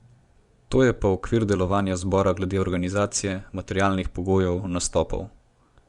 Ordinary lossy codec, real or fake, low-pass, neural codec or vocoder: Opus, 64 kbps; fake; 10.8 kHz; vocoder, 24 kHz, 100 mel bands, Vocos